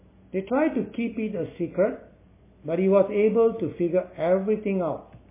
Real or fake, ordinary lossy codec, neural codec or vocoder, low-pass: real; MP3, 16 kbps; none; 3.6 kHz